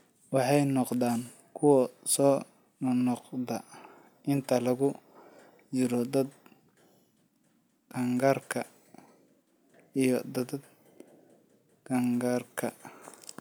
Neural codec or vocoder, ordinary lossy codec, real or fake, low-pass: none; none; real; none